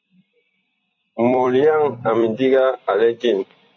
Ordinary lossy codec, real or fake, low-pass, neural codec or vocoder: MP3, 64 kbps; fake; 7.2 kHz; vocoder, 44.1 kHz, 128 mel bands every 256 samples, BigVGAN v2